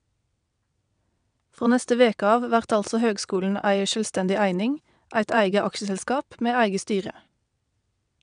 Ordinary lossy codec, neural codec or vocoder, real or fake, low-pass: none; vocoder, 22.05 kHz, 80 mel bands, WaveNeXt; fake; 9.9 kHz